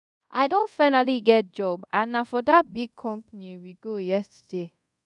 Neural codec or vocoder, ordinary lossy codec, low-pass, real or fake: codec, 24 kHz, 0.5 kbps, DualCodec; none; 10.8 kHz; fake